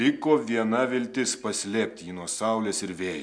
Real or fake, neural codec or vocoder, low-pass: real; none; 9.9 kHz